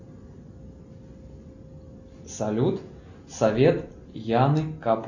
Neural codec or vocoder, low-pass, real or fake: none; 7.2 kHz; real